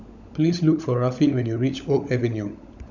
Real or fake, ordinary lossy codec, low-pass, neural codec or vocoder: fake; none; 7.2 kHz; codec, 16 kHz, 16 kbps, FunCodec, trained on LibriTTS, 50 frames a second